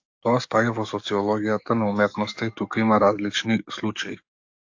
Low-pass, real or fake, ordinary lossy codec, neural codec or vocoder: 7.2 kHz; fake; AAC, 48 kbps; codec, 44.1 kHz, 7.8 kbps, DAC